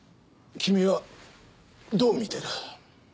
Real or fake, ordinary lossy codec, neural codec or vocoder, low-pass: real; none; none; none